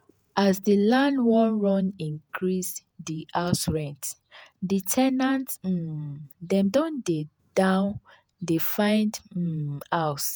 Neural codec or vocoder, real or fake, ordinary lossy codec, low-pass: vocoder, 48 kHz, 128 mel bands, Vocos; fake; none; none